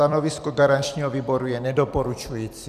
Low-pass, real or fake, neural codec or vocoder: 14.4 kHz; fake; vocoder, 44.1 kHz, 128 mel bands every 256 samples, BigVGAN v2